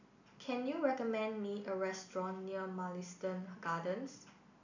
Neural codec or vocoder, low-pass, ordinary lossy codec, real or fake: none; 7.2 kHz; none; real